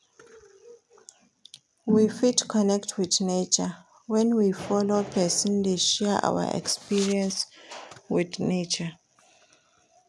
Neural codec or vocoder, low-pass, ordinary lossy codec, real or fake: none; none; none; real